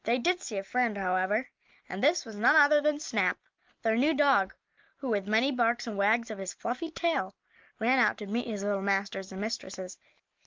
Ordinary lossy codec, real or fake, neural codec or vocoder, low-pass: Opus, 32 kbps; real; none; 7.2 kHz